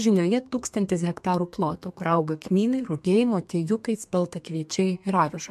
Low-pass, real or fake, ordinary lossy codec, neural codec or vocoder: 14.4 kHz; fake; MP3, 64 kbps; codec, 32 kHz, 1.9 kbps, SNAC